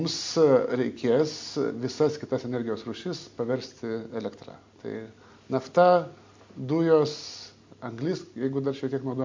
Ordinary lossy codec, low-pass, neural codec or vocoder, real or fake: MP3, 48 kbps; 7.2 kHz; none; real